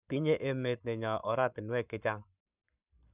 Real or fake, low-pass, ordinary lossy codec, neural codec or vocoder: fake; 3.6 kHz; none; codec, 44.1 kHz, 7.8 kbps, Pupu-Codec